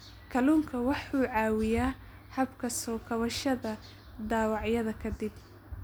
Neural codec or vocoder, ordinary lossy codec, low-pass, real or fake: none; none; none; real